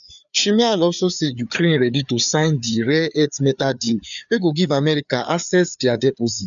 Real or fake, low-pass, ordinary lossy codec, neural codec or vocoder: fake; 7.2 kHz; none; codec, 16 kHz, 4 kbps, FreqCodec, larger model